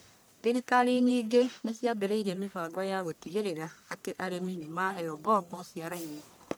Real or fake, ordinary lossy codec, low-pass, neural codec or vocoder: fake; none; none; codec, 44.1 kHz, 1.7 kbps, Pupu-Codec